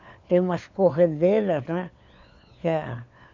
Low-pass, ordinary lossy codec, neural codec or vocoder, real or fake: 7.2 kHz; MP3, 64 kbps; codec, 16 kHz, 4 kbps, FunCodec, trained on LibriTTS, 50 frames a second; fake